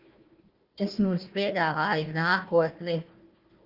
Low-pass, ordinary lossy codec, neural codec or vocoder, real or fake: 5.4 kHz; Opus, 32 kbps; codec, 16 kHz, 1 kbps, FunCodec, trained on Chinese and English, 50 frames a second; fake